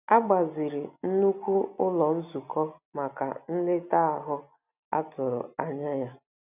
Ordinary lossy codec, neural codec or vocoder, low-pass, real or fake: none; none; 3.6 kHz; real